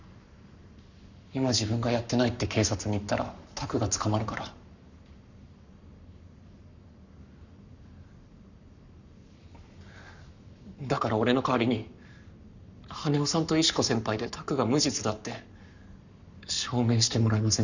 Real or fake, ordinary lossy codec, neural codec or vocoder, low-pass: fake; none; codec, 44.1 kHz, 7.8 kbps, Pupu-Codec; 7.2 kHz